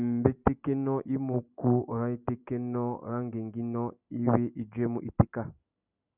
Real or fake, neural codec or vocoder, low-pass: fake; vocoder, 44.1 kHz, 128 mel bands every 256 samples, BigVGAN v2; 3.6 kHz